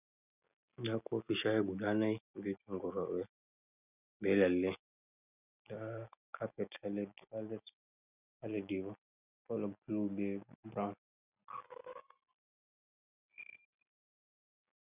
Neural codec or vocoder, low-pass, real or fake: none; 3.6 kHz; real